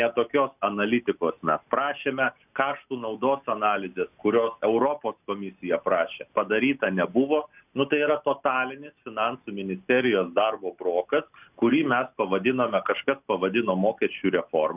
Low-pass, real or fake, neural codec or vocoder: 3.6 kHz; real; none